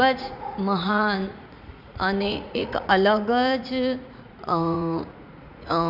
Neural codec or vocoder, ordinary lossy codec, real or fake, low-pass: vocoder, 44.1 kHz, 80 mel bands, Vocos; none; fake; 5.4 kHz